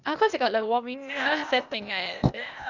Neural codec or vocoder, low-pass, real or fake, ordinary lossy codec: codec, 16 kHz, 0.8 kbps, ZipCodec; 7.2 kHz; fake; none